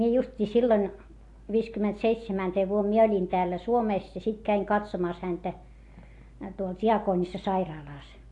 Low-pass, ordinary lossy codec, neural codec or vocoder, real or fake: 10.8 kHz; AAC, 48 kbps; none; real